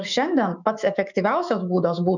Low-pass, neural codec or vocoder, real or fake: 7.2 kHz; autoencoder, 48 kHz, 128 numbers a frame, DAC-VAE, trained on Japanese speech; fake